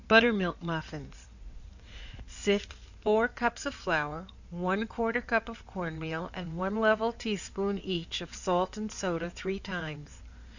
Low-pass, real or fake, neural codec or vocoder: 7.2 kHz; fake; codec, 16 kHz in and 24 kHz out, 2.2 kbps, FireRedTTS-2 codec